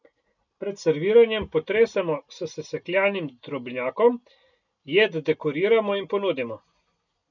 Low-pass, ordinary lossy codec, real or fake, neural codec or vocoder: 7.2 kHz; none; real; none